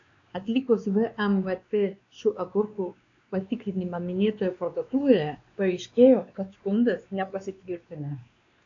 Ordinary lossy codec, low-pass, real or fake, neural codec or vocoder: AAC, 64 kbps; 7.2 kHz; fake; codec, 16 kHz, 2 kbps, X-Codec, WavLM features, trained on Multilingual LibriSpeech